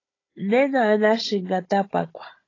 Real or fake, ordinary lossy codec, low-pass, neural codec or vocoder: fake; AAC, 32 kbps; 7.2 kHz; codec, 16 kHz, 16 kbps, FunCodec, trained on Chinese and English, 50 frames a second